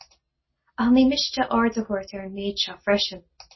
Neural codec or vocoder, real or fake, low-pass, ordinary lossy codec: none; real; 7.2 kHz; MP3, 24 kbps